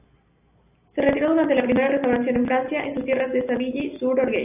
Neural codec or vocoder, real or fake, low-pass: none; real; 3.6 kHz